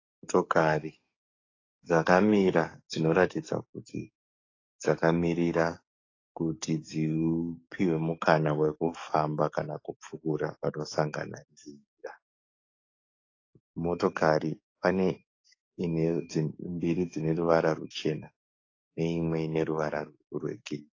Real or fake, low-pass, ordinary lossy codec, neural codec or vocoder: fake; 7.2 kHz; AAC, 32 kbps; codec, 44.1 kHz, 7.8 kbps, DAC